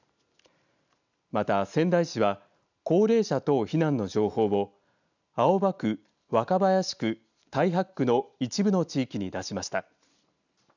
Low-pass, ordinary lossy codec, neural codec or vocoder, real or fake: 7.2 kHz; none; none; real